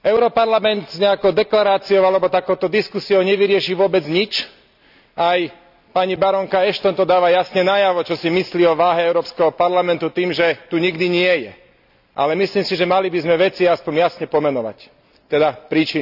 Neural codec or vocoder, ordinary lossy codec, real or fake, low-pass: none; none; real; 5.4 kHz